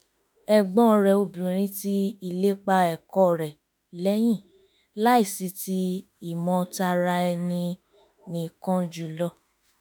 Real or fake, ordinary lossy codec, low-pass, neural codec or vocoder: fake; none; none; autoencoder, 48 kHz, 32 numbers a frame, DAC-VAE, trained on Japanese speech